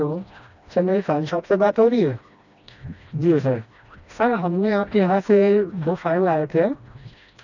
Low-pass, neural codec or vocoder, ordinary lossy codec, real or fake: 7.2 kHz; codec, 16 kHz, 1 kbps, FreqCodec, smaller model; none; fake